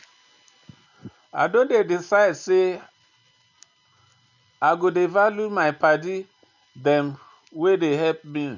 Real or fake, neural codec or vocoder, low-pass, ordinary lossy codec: real; none; 7.2 kHz; none